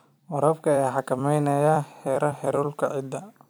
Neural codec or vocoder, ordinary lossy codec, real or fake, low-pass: vocoder, 44.1 kHz, 128 mel bands every 256 samples, BigVGAN v2; none; fake; none